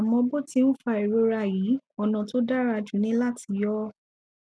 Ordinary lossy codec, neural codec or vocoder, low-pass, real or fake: none; none; none; real